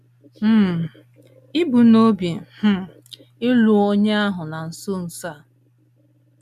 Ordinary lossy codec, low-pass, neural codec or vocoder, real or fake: none; 14.4 kHz; none; real